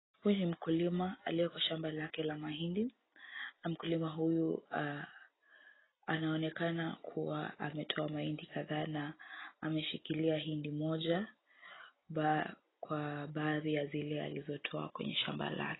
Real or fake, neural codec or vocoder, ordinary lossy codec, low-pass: real; none; AAC, 16 kbps; 7.2 kHz